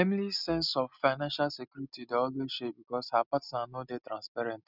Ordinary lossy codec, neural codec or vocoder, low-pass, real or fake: none; none; 5.4 kHz; real